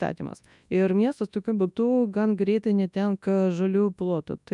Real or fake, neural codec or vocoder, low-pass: fake; codec, 24 kHz, 0.9 kbps, WavTokenizer, large speech release; 10.8 kHz